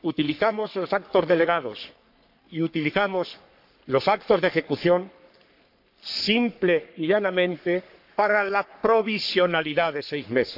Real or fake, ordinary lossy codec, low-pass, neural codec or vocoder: fake; AAC, 48 kbps; 5.4 kHz; codec, 44.1 kHz, 3.4 kbps, Pupu-Codec